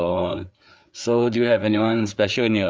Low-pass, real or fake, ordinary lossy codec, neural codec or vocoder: none; fake; none; codec, 16 kHz, 4 kbps, FreqCodec, larger model